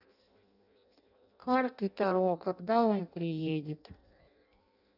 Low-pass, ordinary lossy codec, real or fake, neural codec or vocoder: 5.4 kHz; none; fake; codec, 16 kHz in and 24 kHz out, 0.6 kbps, FireRedTTS-2 codec